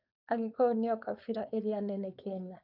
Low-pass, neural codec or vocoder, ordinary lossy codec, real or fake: 5.4 kHz; codec, 16 kHz, 4.8 kbps, FACodec; none; fake